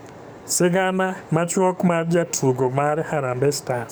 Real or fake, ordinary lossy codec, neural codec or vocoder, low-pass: fake; none; codec, 44.1 kHz, 7.8 kbps, DAC; none